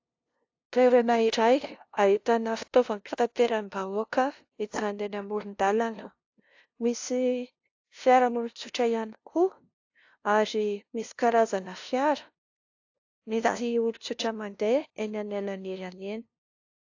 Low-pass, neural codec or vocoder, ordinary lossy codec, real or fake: 7.2 kHz; codec, 16 kHz, 0.5 kbps, FunCodec, trained on LibriTTS, 25 frames a second; AAC, 48 kbps; fake